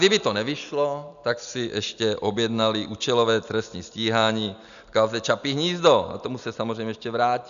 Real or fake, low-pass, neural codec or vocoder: real; 7.2 kHz; none